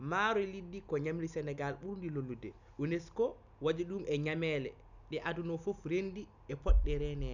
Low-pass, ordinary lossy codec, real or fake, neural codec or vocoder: 7.2 kHz; none; real; none